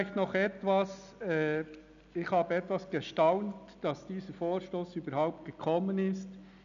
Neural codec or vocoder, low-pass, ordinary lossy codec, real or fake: none; 7.2 kHz; none; real